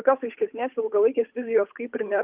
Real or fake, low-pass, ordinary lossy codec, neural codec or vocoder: fake; 3.6 kHz; Opus, 32 kbps; codec, 16 kHz, 8 kbps, FunCodec, trained on Chinese and English, 25 frames a second